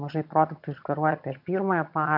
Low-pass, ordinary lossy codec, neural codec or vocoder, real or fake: 5.4 kHz; MP3, 48 kbps; vocoder, 22.05 kHz, 80 mel bands, HiFi-GAN; fake